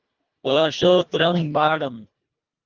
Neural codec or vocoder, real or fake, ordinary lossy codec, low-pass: codec, 24 kHz, 1.5 kbps, HILCodec; fake; Opus, 32 kbps; 7.2 kHz